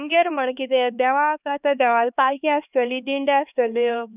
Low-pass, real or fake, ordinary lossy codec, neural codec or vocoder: 3.6 kHz; fake; none; codec, 16 kHz, 2 kbps, X-Codec, HuBERT features, trained on LibriSpeech